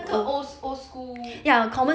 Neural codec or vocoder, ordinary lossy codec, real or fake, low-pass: none; none; real; none